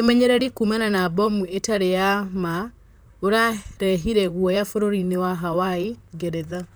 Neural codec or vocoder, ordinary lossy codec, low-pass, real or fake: vocoder, 44.1 kHz, 128 mel bands, Pupu-Vocoder; none; none; fake